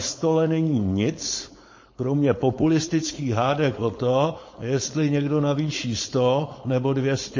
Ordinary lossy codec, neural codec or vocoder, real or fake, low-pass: MP3, 32 kbps; codec, 16 kHz, 4.8 kbps, FACodec; fake; 7.2 kHz